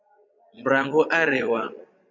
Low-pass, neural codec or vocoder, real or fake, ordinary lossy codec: 7.2 kHz; vocoder, 22.05 kHz, 80 mel bands, Vocos; fake; MP3, 64 kbps